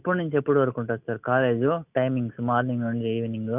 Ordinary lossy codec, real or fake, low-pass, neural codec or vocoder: none; real; 3.6 kHz; none